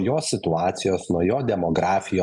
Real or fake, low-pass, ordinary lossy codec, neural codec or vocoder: real; 10.8 kHz; MP3, 96 kbps; none